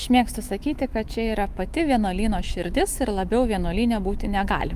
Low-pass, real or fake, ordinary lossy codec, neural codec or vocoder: 14.4 kHz; fake; Opus, 32 kbps; autoencoder, 48 kHz, 128 numbers a frame, DAC-VAE, trained on Japanese speech